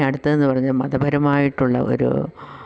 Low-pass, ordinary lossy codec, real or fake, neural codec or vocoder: none; none; real; none